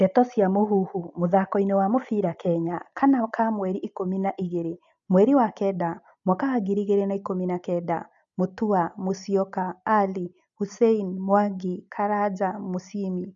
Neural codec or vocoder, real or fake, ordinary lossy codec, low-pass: none; real; none; 7.2 kHz